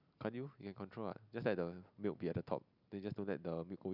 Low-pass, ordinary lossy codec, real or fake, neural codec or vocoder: 5.4 kHz; none; real; none